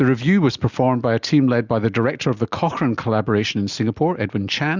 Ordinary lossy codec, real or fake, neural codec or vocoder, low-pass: Opus, 64 kbps; real; none; 7.2 kHz